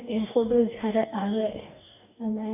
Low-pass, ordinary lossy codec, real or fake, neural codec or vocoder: 3.6 kHz; none; fake; codec, 44.1 kHz, 2.6 kbps, DAC